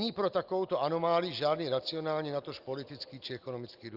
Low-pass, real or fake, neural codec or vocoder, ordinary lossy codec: 5.4 kHz; real; none; Opus, 32 kbps